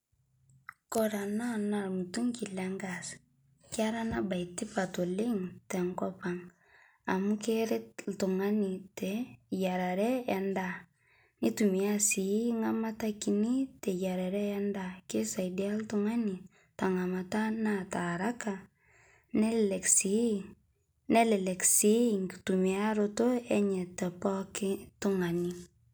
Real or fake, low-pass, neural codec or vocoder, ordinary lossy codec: real; none; none; none